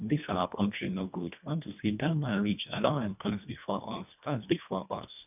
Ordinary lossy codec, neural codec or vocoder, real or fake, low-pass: none; codec, 24 kHz, 1.5 kbps, HILCodec; fake; 3.6 kHz